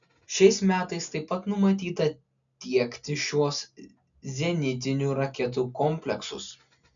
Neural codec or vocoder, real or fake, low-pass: none; real; 7.2 kHz